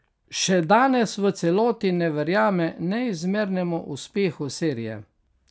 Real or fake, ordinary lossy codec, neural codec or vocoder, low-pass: real; none; none; none